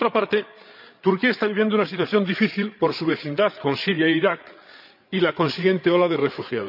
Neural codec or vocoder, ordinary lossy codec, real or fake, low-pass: codec, 16 kHz, 8 kbps, FreqCodec, larger model; none; fake; 5.4 kHz